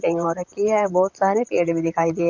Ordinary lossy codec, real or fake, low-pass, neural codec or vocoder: none; fake; 7.2 kHz; vocoder, 44.1 kHz, 128 mel bands, Pupu-Vocoder